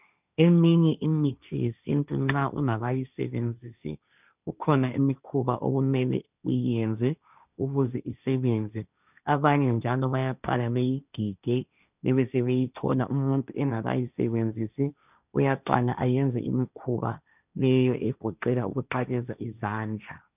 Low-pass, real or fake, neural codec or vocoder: 3.6 kHz; fake; codec, 16 kHz, 1.1 kbps, Voila-Tokenizer